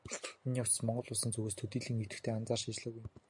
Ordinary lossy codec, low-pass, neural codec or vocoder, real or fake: MP3, 64 kbps; 10.8 kHz; none; real